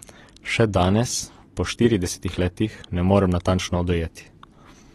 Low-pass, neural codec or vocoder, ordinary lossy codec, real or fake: 19.8 kHz; none; AAC, 32 kbps; real